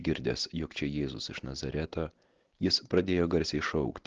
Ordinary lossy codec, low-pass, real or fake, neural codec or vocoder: Opus, 16 kbps; 7.2 kHz; real; none